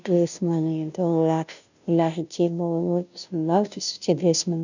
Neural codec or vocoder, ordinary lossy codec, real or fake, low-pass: codec, 16 kHz, 0.5 kbps, FunCodec, trained on Chinese and English, 25 frames a second; none; fake; 7.2 kHz